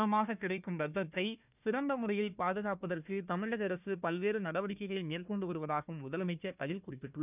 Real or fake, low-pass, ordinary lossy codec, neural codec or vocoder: fake; 3.6 kHz; none; codec, 16 kHz, 1 kbps, FunCodec, trained on Chinese and English, 50 frames a second